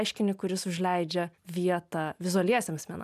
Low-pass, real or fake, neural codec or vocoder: 14.4 kHz; real; none